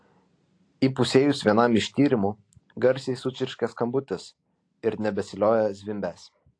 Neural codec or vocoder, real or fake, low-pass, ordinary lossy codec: none; real; 9.9 kHz; AAC, 48 kbps